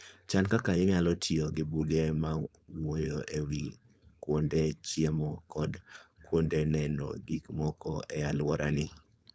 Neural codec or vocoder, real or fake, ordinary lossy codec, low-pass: codec, 16 kHz, 4.8 kbps, FACodec; fake; none; none